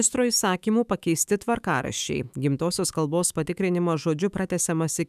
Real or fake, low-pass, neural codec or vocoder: fake; 14.4 kHz; autoencoder, 48 kHz, 128 numbers a frame, DAC-VAE, trained on Japanese speech